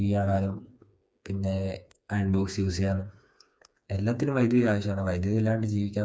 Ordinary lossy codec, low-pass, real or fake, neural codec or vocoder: none; none; fake; codec, 16 kHz, 4 kbps, FreqCodec, smaller model